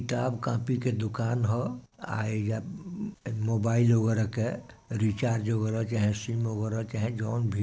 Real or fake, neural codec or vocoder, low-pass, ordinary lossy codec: real; none; none; none